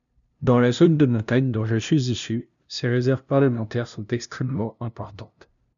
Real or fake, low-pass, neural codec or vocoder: fake; 7.2 kHz; codec, 16 kHz, 0.5 kbps, FunCodec, trained on LibriTTS, 25 frames a second